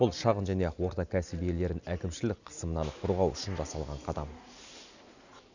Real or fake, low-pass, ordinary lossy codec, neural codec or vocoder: real; 7.2 kHz; none; none